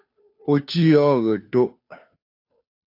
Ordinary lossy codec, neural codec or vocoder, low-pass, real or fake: AAC, 32 kbps; codec, 16 kHz, 2 kbps, FunCodec, trained on Chinese and English, 25 frames a second; 5.4 kHz; fake